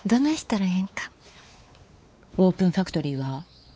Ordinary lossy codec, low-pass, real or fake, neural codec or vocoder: none; none; fake; codec, 16 kHz, 4 kbps, X-Codec, WavLM features, trained on Multilingual LibriSpeech